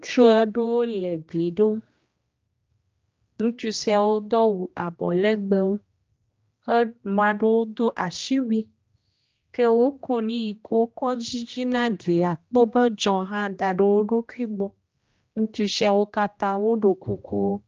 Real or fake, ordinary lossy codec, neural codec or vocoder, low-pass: fake; Opus, 24 kbps; codec, 16 kHz, 1 kbps, X-Codec, HuBERT features, trained on general audio; 7.2 kHz